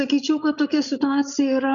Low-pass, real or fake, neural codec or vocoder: 7.2 kHz; fake; codec, 16 kHz, 16 kbps, FreqCodec, larger model